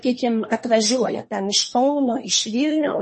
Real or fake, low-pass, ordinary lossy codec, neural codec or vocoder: fake; 10.8 kHz; MP3, 32 kbps; codec, 24 kHz, 1 kbps, SNAC